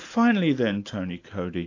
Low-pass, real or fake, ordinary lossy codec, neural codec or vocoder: 7.2 kHz; real; AAC, 48 kbps; none